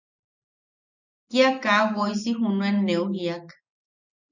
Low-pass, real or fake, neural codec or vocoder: 7.2 kHz; real; none